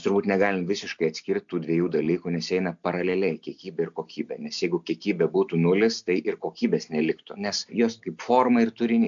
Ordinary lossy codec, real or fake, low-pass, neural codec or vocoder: MP3, 64 kbps; real; 7.2 kHz; none